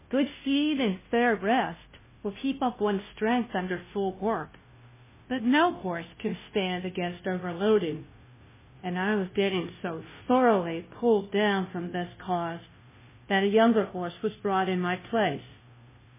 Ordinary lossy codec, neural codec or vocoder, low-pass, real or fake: MP3, 16 kbps; codec, 16 kHz, 0.5 kbps, FunCodec, trained on Chinese and English, 25 frames a second; 3.6 kHz; fake